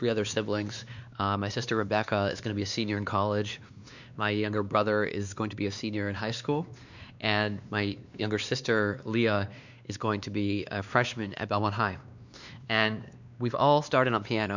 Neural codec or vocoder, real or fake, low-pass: codec, 16 kHz, 2 kbps, X-Codec, WavLM features, trained on Multilingual LibriSpeech; fake; 7.2 kHz